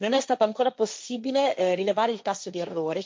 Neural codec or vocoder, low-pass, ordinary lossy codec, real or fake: codec, 16 kHz, 1.1 kbps, Voila-Tokenizer; none; none; fake